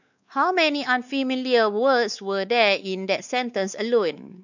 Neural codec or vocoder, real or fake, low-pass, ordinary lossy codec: codec, 16 kHz, 4 kbps, X-Codec, WavLM features, trained on Multilingual LibriSpeech; fake; 7.2 kHz; none